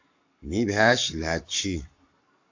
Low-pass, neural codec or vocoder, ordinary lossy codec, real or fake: 7.2 kHz; codec, 44.1 kHz, 7.8 kbps, Pupu-Codec; AAC, 48 kbps; fake